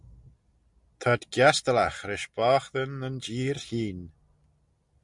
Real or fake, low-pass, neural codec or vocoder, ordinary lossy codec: real; 10.8 kHz; none; MP3, 96 kbps